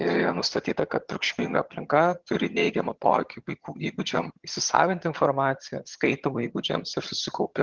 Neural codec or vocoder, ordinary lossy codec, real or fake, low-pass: vocoder, 22.05 kHz, 80 mel bands, HiFi-GAN; Opus, 16 kbps; fake; 7.2 kHz